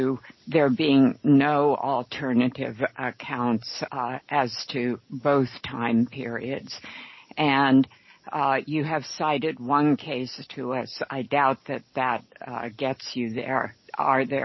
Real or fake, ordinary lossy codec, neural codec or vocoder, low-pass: real; MP3, 24 kbps; none; 7.2 kHz